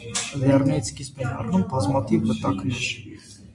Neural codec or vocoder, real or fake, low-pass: none; real; 10.8 kHz